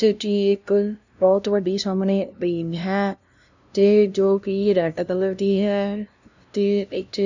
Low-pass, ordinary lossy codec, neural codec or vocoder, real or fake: 7.2 kHz; none; codec, 16 kHz, 0.5 kbps, FunCodec, trained on LibriTTS, 25 frames a second; fake